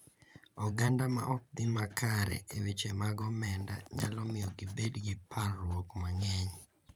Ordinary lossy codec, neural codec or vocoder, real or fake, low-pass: none; vocoder, 44.1 kHz, 128 mel bands every 512 samples, BigVGAN v2; fake; none